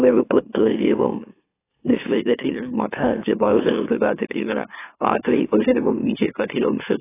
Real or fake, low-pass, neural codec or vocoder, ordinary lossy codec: fake; 3.6 kHz; autoencoder, 44.1 kHz, a latent of 192 numbers a frame, MeloTTS; AAC, 16 kbps